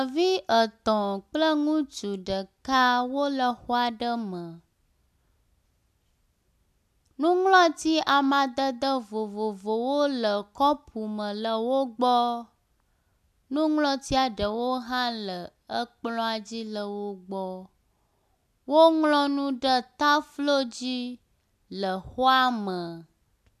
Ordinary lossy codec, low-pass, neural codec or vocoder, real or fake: AAC, 96 kbps; 14.4 kHz; none; real